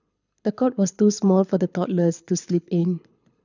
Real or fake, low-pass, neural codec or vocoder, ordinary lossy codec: fake; 7.2 kHz; codec, 24 kHz, 6 kbps, HILCodec; none